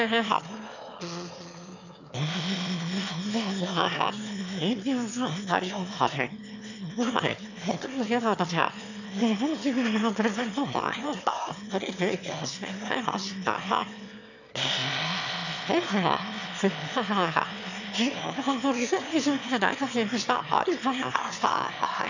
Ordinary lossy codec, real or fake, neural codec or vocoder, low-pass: none; fake; autoencoder, 22.05 kHz, a latent of 192 numbers a frame, VITS, trained on one speaker; 7.2 kHz